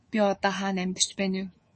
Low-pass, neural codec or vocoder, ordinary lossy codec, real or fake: 10.8 kHz; codec, 24 kHz, 3.1 kbps, DualCodec; MP3, 32 kbps; fake